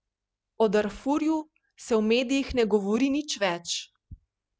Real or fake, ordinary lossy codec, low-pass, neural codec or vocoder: real; none; none; none